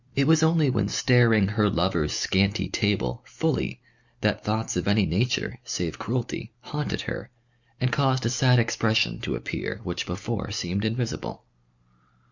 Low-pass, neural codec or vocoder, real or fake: 7.2 kHz; none; real